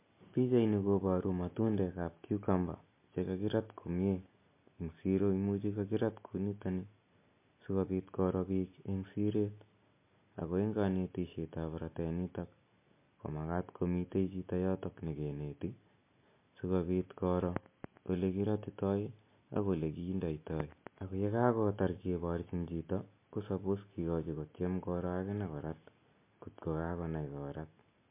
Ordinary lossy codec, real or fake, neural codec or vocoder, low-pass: MP3, 24 kbps; real; none; 3.6 kHz